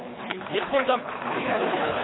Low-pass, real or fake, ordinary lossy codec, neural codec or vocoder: 7.2 kHz; fake; AAC, 16 kbps; codec, 24 kHz, 3 kbps, HILCodec